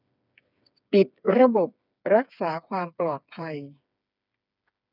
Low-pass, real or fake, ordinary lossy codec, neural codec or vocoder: 5.4 kHz; fake; none; codec, 16 kHz, 4 kbps, FreqCodec, smaller model